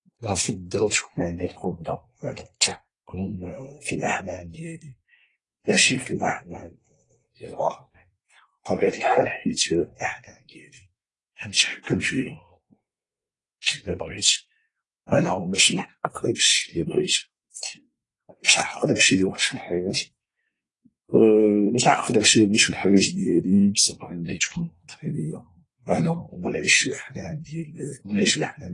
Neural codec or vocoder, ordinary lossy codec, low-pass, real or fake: codec, 24 kHz, 1 kbps, SNAC; AAC, 32 kbps; 10.8 kHz; fake